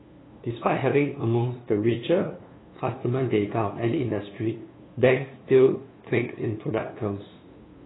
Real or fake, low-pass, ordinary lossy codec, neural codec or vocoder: fake; 7.2 kHz; AAC, 16 kbps; codec, 16 kHz, 2 kbps, FunCodec, trained on LibriTTS, 25 frames a second